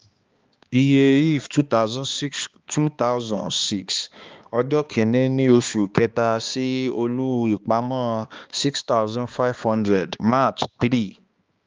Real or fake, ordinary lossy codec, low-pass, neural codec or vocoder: fake; Opus, 32 kbps; 7.2 kHz; codec, 16 kHz, 2 kbps, X-Codec, HuBERT features, trained on balanced general audio